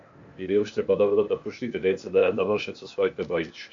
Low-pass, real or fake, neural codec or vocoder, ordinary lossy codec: 7.2 kHz; fake; codec, 16 kHz, 0.8 kbps, ZipCodec; MP3, 48 kbps